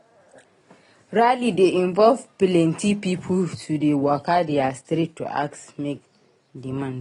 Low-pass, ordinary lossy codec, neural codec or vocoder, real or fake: 10.8 kHz; AAC, 32 kbps; none; real